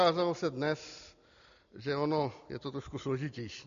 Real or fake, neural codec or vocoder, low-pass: real; none; 7.2 kHz